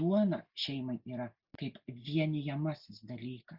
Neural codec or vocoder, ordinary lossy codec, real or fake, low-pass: none; Opus, 64 kbps; real; 5.4 kHz